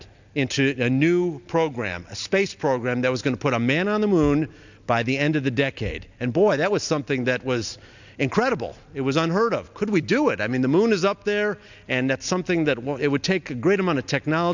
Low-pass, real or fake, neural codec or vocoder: 7.2 kHz; real; none